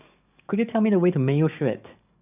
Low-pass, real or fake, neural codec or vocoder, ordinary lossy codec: 3.6 kHz; fake; codec, 44.1 kHz, 7.8 kbps, DAC; none